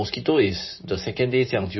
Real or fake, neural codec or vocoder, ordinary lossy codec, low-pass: fake; vocoder, 44.1 kHz, 128 mel bands every 512 samples, BigVGAN v2; MP3, 24 kbps; 7.2 kHz